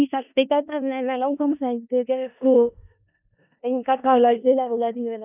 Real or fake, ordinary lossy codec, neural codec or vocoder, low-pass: fake; none; codec, 16 kHz in and 24 kHz out, 0.4 kbps, LongCat-Audio-Codec, four codebook decoder; 3.6 kHz